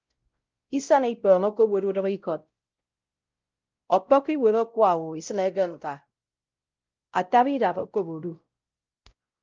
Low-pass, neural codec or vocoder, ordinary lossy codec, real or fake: 7.2 kHz; codec, 16 kHz, 0.5 kbps, X-Codec, WavLM features, trained on Multilingual LibriSpeech; Opus, 24 kbps; fake